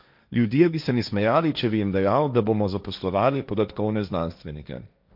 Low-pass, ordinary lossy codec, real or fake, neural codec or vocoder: 5.4 kHz; none; fake; codec, 16 kHz, 1.1 kbps, Voila-Tokenizer